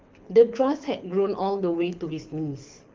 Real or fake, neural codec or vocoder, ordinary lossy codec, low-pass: fake; codec, 24 kHz, 6 kbps, HILCodec; Opus, 24 kbps; 7.2 kHz